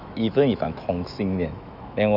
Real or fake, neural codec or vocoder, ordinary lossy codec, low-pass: fake; autoencoder, 48 kHz, 128 numbers a frame, DAC-VAE, trained on Japanese speech; none; 5.4 kHz